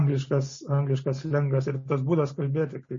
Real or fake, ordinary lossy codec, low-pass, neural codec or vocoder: real; MP3, 32 kbps; 7.2 kHz; none